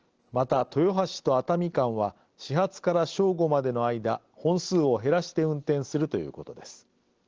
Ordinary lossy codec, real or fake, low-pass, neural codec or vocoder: Opus, 16 kbps; real; 7.2 kHz; none